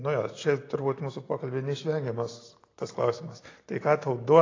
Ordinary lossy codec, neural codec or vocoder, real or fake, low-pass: AAC, 32 kbps; none; real; 7.2 kHz